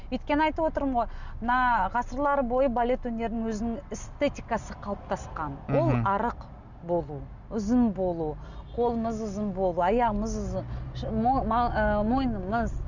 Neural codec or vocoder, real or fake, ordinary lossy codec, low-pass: none; real; none; 7.2 kHz